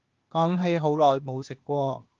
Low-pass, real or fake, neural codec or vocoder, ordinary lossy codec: 7.2 kHz; fake; codec, 16 kHz, 0.8 kbps, ZipCodec; Opus, 32 kbps